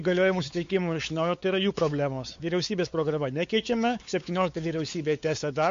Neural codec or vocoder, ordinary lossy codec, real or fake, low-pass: codec, 16 kHz, 4 kbps, X-Codec, WavLM features, trained on Multilingual LibriSpeech; MP3, 48 kbps; fake; 7.2 kHz